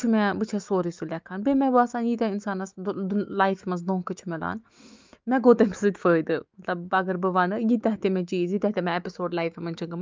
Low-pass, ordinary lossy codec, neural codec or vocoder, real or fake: 7.2 kHz; Opus, 24 kbps; autoencoder, 48 kHz, 128 numbers a frame, DAC-VAE, trained on Japanese speech; fake